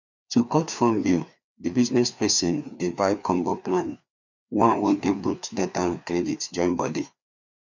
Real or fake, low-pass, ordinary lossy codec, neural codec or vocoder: fake; 7.2 kHz; none; codec, 16 kHz, 2 kbps, FreqCodec, larger model